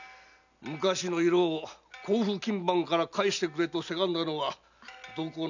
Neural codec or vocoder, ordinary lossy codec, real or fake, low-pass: none; none; real; 7.2 kHz